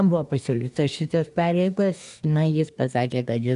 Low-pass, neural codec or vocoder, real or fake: 10.8 kHz; codec, 24 kHz, 1 kbps, SNAC; fake